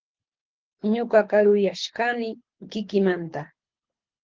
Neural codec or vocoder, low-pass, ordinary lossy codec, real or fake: codec, 24 kHz, 6 kbps, HILCodec; 7.2 kHz; Opus, 16 kbps; fake